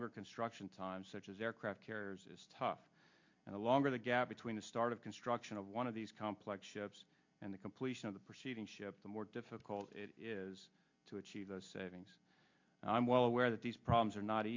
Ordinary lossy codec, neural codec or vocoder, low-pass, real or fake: MP3, 48 kbps; none; 7.2 kHz; real